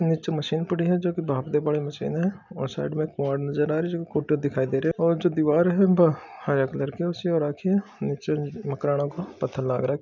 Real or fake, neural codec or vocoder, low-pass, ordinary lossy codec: real; none; 7.2 kHz; none